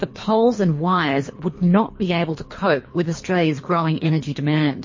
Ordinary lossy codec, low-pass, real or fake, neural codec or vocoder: MP3, 32 kbps; 7.2 kHz; fake; codec, 24 kHz, 3 kbps, HILCodec